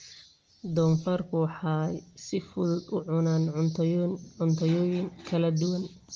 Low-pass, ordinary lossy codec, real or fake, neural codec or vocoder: 7.2 kHz; Opus, 24 kbps; real; none